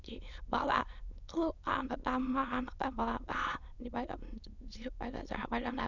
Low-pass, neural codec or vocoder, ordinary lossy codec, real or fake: 7.2 kHz; autoencoder, 22.05 kHz, a latent of 192 numbers a frame, VITS, trained on many speakers; none; fake